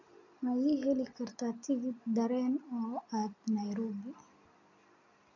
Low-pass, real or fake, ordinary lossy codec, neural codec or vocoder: 7.2 kHz; real; none; none